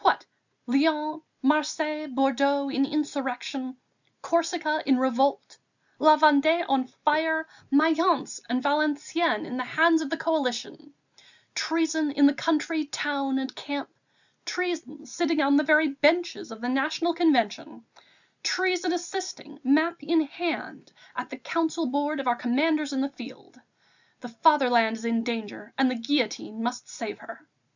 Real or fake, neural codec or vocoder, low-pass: real; none; 7.2 kHz